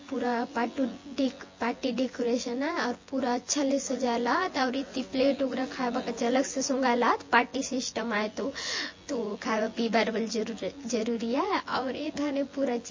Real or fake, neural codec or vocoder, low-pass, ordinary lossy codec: fake; vocoder, 24 kHz, 100 mel bands, Vocos; 7.2 kHz; MP3, 32 kbps